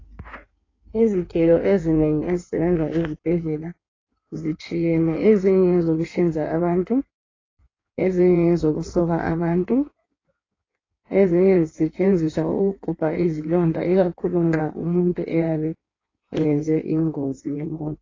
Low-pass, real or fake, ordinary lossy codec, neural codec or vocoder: 7.2 kHz; fake; AAC, 32 kbps; codec, 16 kHz in and 24 kHz out, 1.1 kbps, FireRedTTS-2 codec